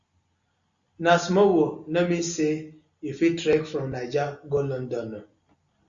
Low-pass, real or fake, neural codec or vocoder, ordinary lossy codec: 7.2 kHz; real; none; Opus, 64 kbps